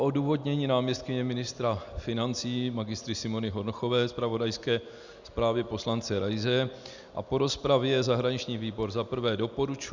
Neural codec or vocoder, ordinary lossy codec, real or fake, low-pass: none; Opus, 64 kbps; real; 7.2 kHz